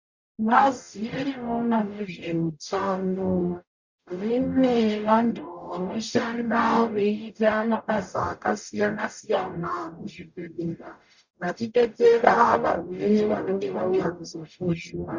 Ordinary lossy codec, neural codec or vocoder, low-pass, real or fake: Opus, 64 kbps; codec, 44.1 kHz, 0.9 kbps, DAC; 7.2 kHz; fake